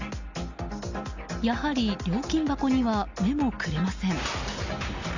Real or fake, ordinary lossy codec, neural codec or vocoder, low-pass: real; Opus, 64 kbps; none; 7.2 kHz